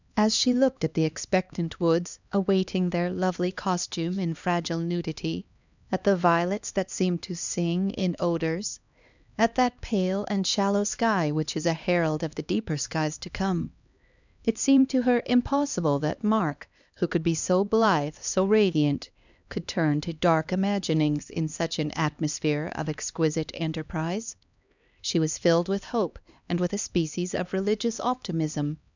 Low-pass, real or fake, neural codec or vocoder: 7.2 kHz; fake; codec, 16 kHz, 2 kbps, X-Codec, HuBERT features, trained on LibriSpeech